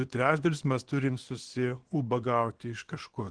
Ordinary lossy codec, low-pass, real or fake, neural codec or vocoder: Opus, 16 kbps; 9.9 kHz; fake; codec, 24 kHz, 0.9 kbps, WavTokenizer, small release